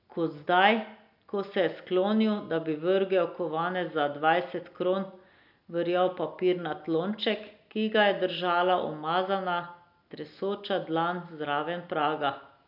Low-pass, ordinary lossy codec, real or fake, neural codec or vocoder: 5.4 kHz; none; real; none